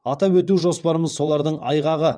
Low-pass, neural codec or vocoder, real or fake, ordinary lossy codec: 9.9 kHz; vocoder, 22.05 kHz, 80 mel bands, WaveNeXt; fake; none